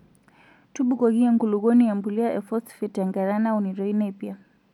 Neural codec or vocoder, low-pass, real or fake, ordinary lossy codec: none; 19.8 kHz; real; none